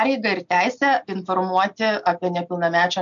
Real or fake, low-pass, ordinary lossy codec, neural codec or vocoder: real; 7.2 kHz; MP3, 64 kbps; none